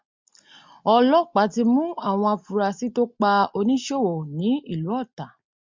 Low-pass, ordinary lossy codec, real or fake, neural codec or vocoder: 7.2 kHz; MP3, 64 kbps; real; none